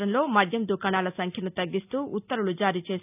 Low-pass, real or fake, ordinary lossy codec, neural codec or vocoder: 3.6 kHz; real; none; none